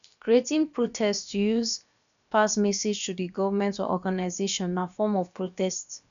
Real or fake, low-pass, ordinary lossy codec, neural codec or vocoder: fake; 7.2 kHz; none; codec, 16 kHz, about 1 kbps, DyCAST, with the encoder's durations